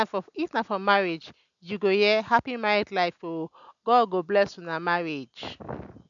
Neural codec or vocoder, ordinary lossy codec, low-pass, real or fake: none; none; 7.2 kHz; real